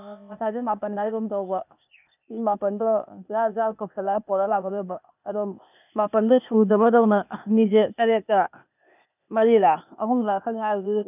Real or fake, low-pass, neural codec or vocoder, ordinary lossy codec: fake; 3.6 kHz; codec, 16 kHz, 0.8 kbps, ZipCodec; none